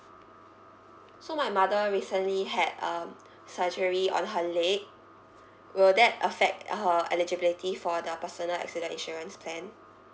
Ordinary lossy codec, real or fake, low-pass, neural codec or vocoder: none; real; none; none